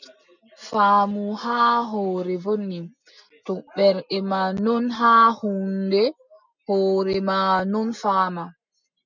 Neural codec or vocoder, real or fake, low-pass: none; real; 7.2 kHz